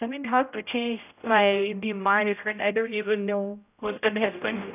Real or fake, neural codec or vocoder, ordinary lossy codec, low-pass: fake; codec, 16 kHz, 0.5 kbps, X-Codec, HuBERT features, trained on general audio; none; 3.6 kHz